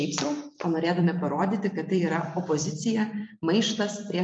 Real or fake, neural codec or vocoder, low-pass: fake; vocoder, 44.1 kHz, 128 mel bands every 256 samples, BigVGAN v2; 9.9 kHz